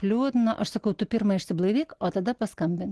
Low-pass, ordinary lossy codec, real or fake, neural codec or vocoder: 10.8 kHz; Opus, 16 kbps; real; none